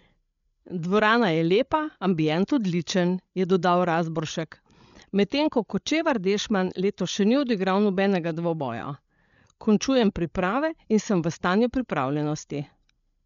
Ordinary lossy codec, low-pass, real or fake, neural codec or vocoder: none; 7.2 kHz; fake; codec, 16 kHz, 8 kbps, FreqCodec, larger model